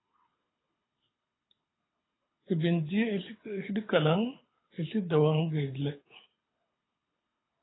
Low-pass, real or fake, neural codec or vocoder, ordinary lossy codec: 7.2 kHz; fake; codec, 24 kHz, 6 kbps, HILCodec; AAC, 16 kbps